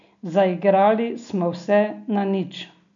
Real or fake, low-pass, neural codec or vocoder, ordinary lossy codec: real; 7.2 kHz; none; none